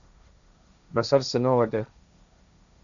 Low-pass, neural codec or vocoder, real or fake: 7.2 kHz; codec, 16 kHz, 1.1 kbps, Voila-Tokenizer; fake